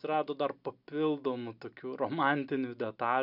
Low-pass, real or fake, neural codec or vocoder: 5.4 kHz; real; none